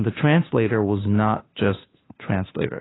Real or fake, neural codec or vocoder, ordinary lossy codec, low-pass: fake; codec, 16 kHz, 2 kbps, FunCodec, trained on Chinese and English, 25 frames a second; AAC, 16 kbps; 7.2 kHz